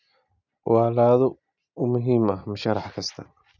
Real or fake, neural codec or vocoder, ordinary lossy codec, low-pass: real; none; none; 7.2 kHz